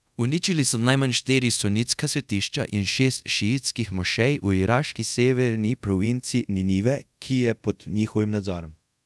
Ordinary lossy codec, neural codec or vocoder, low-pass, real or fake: none; codec, 24 kHz, 0.5 kbps, DualCodec; none; fake